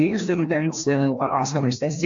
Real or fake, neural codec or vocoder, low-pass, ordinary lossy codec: fake; codec, 16 kHz, 1 kbps, FreqCodec, larger model; 7.2 kHz; AAC, 64 kbps